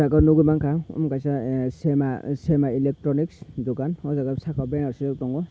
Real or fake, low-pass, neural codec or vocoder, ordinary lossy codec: real; none; none; none